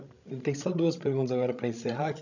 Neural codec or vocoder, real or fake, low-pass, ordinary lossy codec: codec, 16 kHz, 16 kbps, FreqCodec, larger model; fake; 7.2 kHz; none